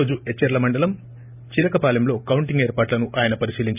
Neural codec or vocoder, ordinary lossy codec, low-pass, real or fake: none; none; 3.6 kHz; real